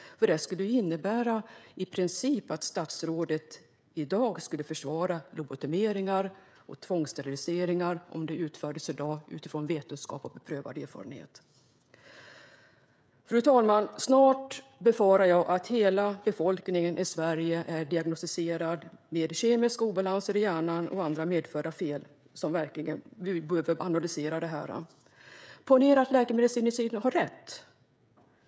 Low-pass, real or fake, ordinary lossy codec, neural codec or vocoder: none; fake; none; codec, 16 kHz, 16 kbps, FreqCodec, smaller model